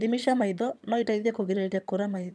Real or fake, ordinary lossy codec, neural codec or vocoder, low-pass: fake; none; vocoder, 22.05 kHz, 80 mel bands, HiFi-GAN; none